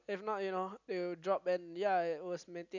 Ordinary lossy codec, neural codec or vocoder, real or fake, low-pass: none; none; real; 7.2 kHz